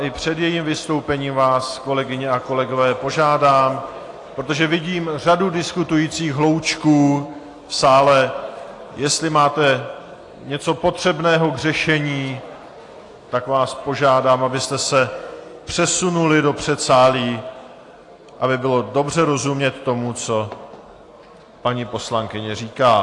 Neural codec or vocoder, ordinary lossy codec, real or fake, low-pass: none; AAC, 48 kbps; real; 10.8 kHz